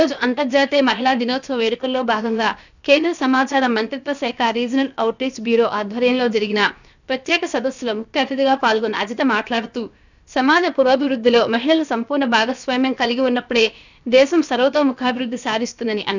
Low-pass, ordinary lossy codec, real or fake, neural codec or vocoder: 7.2 kHz; none; fake; codec, 16 kHz, about 1 kbps, DyCAST, with the encoder's durations